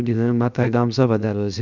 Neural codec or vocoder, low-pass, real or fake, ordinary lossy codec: codec, 16 kHz, 0.7 kbps, FocalCodec; 7.2 kHz; fake; none